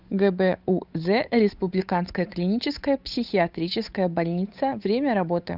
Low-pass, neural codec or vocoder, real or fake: 5.4 kHz; codec, 16 kHz, 8 kbps, FunCodec, trained on Chinese and English, 25 frames a second; fake